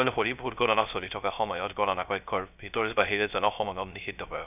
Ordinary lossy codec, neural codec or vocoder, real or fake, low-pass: none; codec, 16 kHz, 0.3 kbps, FocalCodec; fake; 3.6 kHz